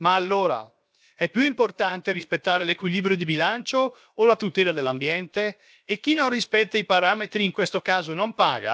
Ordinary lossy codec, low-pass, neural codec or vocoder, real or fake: none; none; codec, 16 kHz, 0.7 kbps, FocalCodec; fake